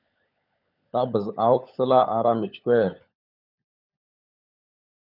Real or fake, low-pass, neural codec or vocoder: fake; 5.4 kHz; codec, 16 kHz, 16 kbps, FunCodec, trained on LibriTTS, 50 frames a second